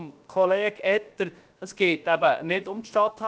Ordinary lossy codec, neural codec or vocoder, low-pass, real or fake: none; codec, 16 kHz, 0.7 kbps, FocalCodec; none; fake